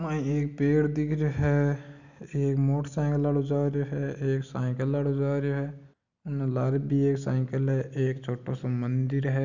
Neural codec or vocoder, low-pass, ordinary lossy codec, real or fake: none; 7.2 kHz; none; real